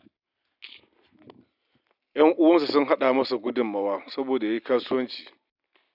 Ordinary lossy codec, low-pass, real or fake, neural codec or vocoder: none; 5.4 kHz; real; none